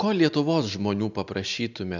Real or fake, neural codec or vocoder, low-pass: real; none; 7.2 kHz